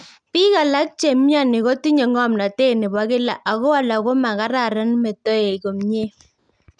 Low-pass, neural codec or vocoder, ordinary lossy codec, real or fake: 9.9 kHz; none; none; real